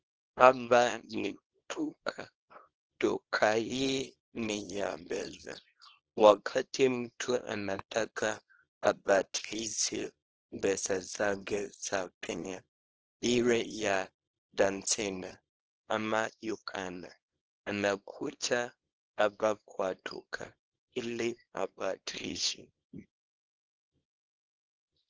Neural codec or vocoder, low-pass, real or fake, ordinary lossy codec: codec, 24 kHz, 0.9 kbps, WavTokenizer, small release; 7.2 kHz; fake; Opus, 16 kbps